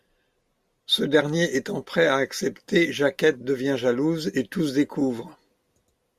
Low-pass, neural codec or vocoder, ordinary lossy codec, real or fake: 14.4 kHz; none; Opus, 64 kbps; real